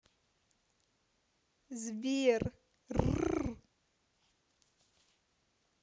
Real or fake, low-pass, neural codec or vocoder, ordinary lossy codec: real; none; none; none